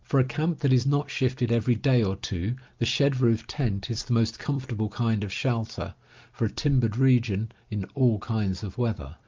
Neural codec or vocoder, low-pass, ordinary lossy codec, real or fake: none; 7.2 kHz; Opus, 24 kbps; real